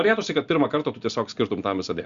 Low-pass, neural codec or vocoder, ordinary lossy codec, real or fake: 7.2 kHz; none; Opus, 64 kbps; real